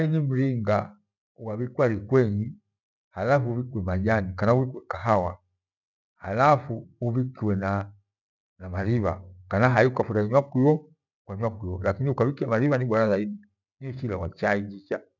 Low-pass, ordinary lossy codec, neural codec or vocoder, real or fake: 7.2 kHz; none; none; real